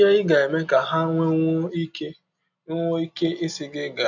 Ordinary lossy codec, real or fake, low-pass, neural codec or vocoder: none; real; 7.2 kHz; none